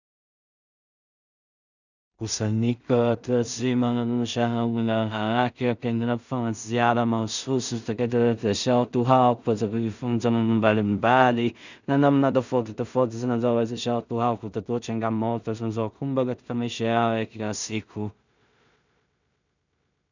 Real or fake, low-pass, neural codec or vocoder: fake; 7.2 kHz; codec, 16 kHz in and 24 kHz out, 0.4 kbps, LongCat-Audio-Codec, two codebook decoder